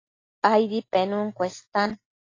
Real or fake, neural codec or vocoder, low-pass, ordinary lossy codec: real; none; 7.2 kHz; AAC, 32 kbps